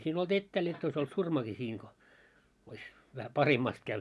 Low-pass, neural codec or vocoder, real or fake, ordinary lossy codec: none; none; real; none